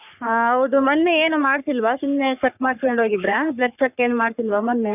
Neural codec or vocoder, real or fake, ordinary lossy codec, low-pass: codec, 44.1 kHz, 3.4 kbps, Pupu-Codec; fake; none; 3.6 kHz